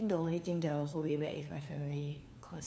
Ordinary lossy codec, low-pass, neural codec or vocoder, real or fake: none; none; codec, 16 kHz, 2 kbps, FunCodec, trained on LibriTTS, 25 frames a second; fake